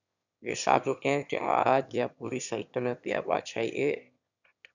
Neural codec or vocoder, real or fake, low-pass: autoencoder, 22.05 kHz, a latent of 192 numbers a frame, VITS, trained on one speaker; fake; 7.2 kHz